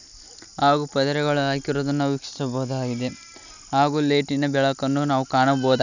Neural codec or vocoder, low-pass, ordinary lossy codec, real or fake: none; 7.2 kHz; none; real